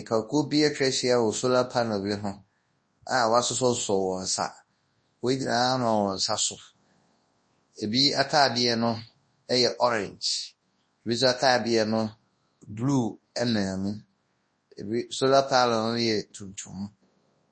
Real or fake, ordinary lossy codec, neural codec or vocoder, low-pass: fake; MP3, 32 kbps; codec, 24 kHz, 0.9 kbps, WavTokenizer, large speech release; 9.9 kHz